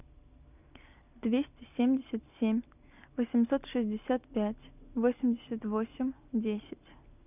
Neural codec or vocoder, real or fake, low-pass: none; real; 3.6 kHz